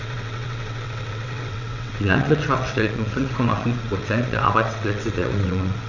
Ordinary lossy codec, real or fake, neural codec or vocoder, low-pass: none; fake; vocoder, 22.05 kHz, 80 mel bands, WaveNeXt; 7.2 kHz